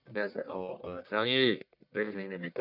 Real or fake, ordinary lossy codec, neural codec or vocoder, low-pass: fake; none; codec, 44.1 kHz, 1.7 kbps, Pupu-Codec; 5.4 kHz